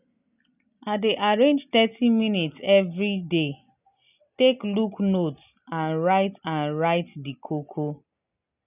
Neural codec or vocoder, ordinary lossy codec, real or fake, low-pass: none; none; real; 3.6 kHz